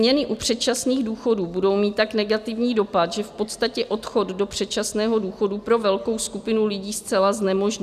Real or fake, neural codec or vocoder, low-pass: real; none; 14.4 kHz